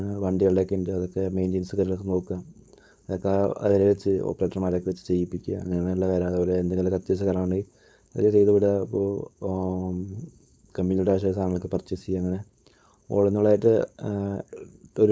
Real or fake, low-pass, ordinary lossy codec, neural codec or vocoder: fake; none; none; codec, 16 kHz, 4.8 kbps, FACodec